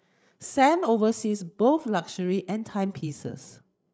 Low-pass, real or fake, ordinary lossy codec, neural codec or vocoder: none; fake; none; codec, 16 kHz, 8 kbps, FreqCodec, larger model